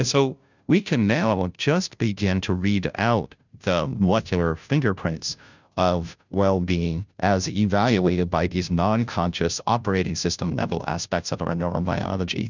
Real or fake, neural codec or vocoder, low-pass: fake; codec, 16 kHz, 0.5 kbps, FunCodec, trained on Chinese and English, 25 frames a second; 7.2 kHz